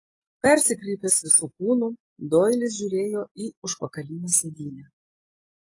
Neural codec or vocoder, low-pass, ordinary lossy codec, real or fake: none; 10.8 kHz; AAC, 32 kbps; real